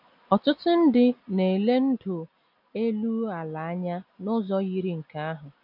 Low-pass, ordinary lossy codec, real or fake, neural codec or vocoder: 5.4 kHz; none; real; none